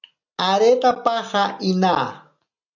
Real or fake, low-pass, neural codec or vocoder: real; 7.2 kHz; none